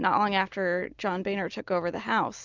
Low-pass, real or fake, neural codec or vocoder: 7.2 kHz; real; none